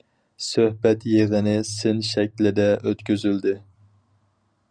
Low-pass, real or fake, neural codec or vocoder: 9.9 kHz; real; none